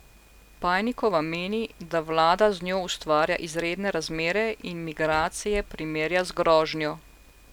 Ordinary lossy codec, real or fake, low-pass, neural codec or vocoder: none; real; 19.8 kHz; none